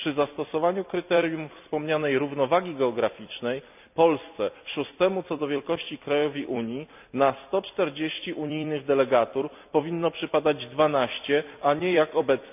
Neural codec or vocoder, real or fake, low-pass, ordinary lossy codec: vocoder, 44.1 kHz, 128 mel bands every 256 samples, BigVGAN v2; fake; 3.6 kHz; none